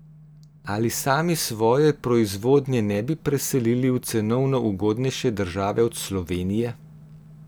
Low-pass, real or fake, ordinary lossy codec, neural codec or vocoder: none; real; none; none